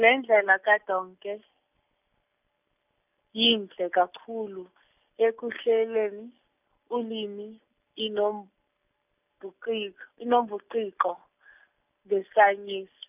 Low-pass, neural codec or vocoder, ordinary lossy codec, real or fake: 3.6 kHz; none; none; real